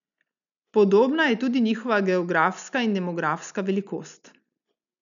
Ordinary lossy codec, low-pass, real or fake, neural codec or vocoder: none; 7.2 kHz; real; none